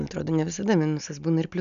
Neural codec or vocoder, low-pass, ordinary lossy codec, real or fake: none; 7.2 kHz; AAC, 96 kbps; real